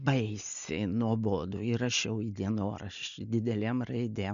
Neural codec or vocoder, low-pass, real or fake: none; 7.2 kHz; real